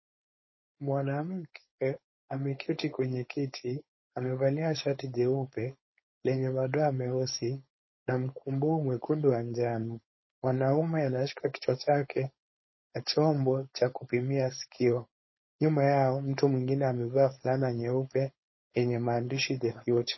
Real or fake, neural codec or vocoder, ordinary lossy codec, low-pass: fake; codec, 16 kHz, 4.8 kbps, FACodec; MP3, 24 kbps; 7.2 kHz